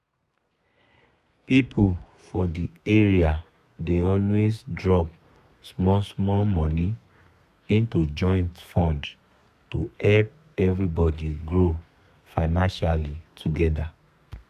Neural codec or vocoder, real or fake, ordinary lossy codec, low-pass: codec, 32 kHz, 1.9 kbps, SNAC; fake; none; 14.4 kHz